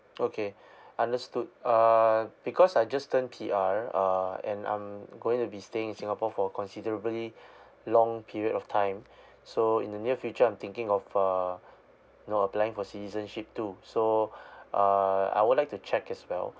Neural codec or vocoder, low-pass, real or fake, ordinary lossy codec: none; none; real; none